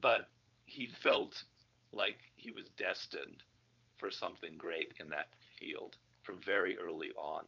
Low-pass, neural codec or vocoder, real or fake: 7.2 kHz; codec, 16 kHz, 4.8 kbps, FACodec; fake